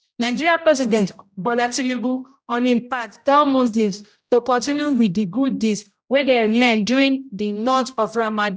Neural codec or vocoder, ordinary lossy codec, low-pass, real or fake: codec, 16 kHz, 0.5 kbps, X-Codec, HuBERT features, trained on general audio; none; none; fake